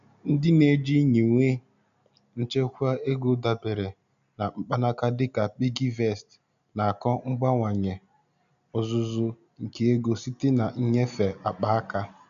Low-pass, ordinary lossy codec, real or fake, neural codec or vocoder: 7.2 kHz; none; real; none